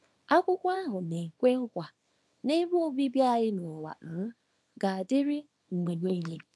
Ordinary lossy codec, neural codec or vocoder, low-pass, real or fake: none; codec, 24 kHz, 0.9 kbps, WavTokenizer, small release; none; fake